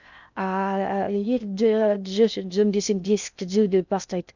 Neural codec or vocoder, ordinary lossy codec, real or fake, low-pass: codec, 16 kHz in and 24 kHz out, 0.6 kbps, FocalCodec, streaming, 2048 codes; none; fake; 7.2 kHz